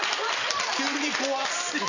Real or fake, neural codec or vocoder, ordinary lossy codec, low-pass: real; none; none; 7.2 kHz